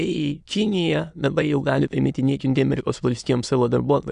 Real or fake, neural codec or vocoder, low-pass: fake; autoencoder, 22.05 kHz, a latent of 192 numbers a frame, VITS, trained on many speakers; 9.9 kHz